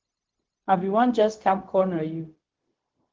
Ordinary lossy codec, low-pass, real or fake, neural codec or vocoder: Opus, 16 kbps; 7.2 kHz; fake; codec, 16 kHz, 0.4 kbps, LongCat-Audio-Codec